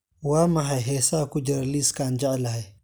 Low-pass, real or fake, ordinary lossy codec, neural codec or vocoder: none; real; none; none